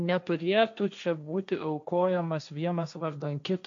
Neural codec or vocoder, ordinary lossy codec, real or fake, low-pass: codec, 16 kHz, 1.1 kbps, Voila-Tokenizer; MP3, 64 kbps; fake; 7.2 kHz